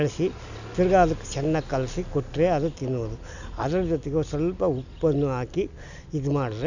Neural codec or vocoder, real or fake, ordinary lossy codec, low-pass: none; real; none; 7.2 kHz